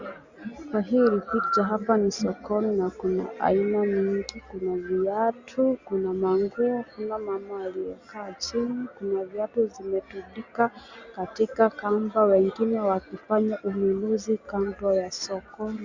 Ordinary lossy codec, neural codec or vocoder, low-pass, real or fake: Opus, 64 kbps; none; 7.2 kHz; real